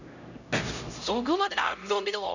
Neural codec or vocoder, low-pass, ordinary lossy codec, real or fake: codec, 16 kHz, 0.5 kbps, X-Codec, HuBERT features, trained on LibriSpeech; 7.2 kHz; none; fake